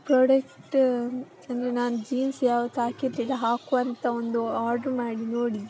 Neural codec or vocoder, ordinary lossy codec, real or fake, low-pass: none; none; real; none